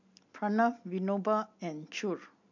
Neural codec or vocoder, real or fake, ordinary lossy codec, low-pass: none; real; MP3, 48 kbps; 7.2 kHz